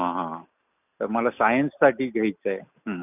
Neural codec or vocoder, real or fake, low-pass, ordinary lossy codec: none; real; 3.6 kHz; none